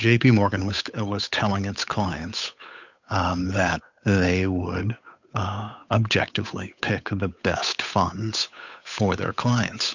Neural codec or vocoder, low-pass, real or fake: codec, 16 kHz, 8 kbps, FunCodec, trained on Chinese and English, 25 frames a second; 7.2 kHz; fake